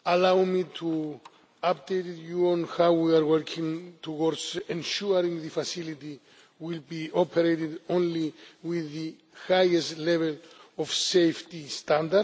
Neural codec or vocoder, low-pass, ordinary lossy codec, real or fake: none; none; none; real